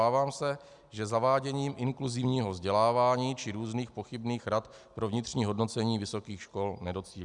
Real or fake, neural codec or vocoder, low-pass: real; none; 10.8 kHz